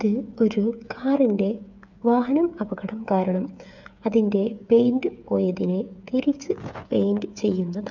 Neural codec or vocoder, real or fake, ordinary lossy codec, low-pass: codec, 16 kHz, 8 kbps, FreqCodec, smaller model; fake; none; 7.2 kHz